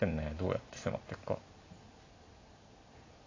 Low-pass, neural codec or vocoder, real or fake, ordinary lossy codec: 7.2 kHz; none; real; AAC, 32 kbps